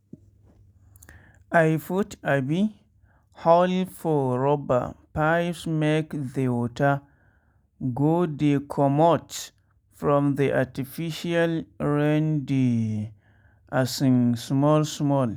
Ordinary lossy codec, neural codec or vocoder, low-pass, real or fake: none; none; none; real